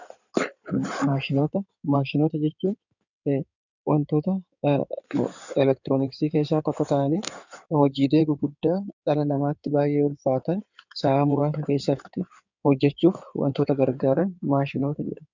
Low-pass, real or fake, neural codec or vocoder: 7.2 kHz; fake; codec, 16 kHz in and 24 kHz out, 2.2 kbps, FireRedTTS-2 codec